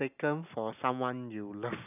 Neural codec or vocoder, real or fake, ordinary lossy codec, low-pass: none; real; none; 3.6 kHz